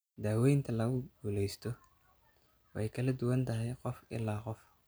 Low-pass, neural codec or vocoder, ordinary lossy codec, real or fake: none; none; none; real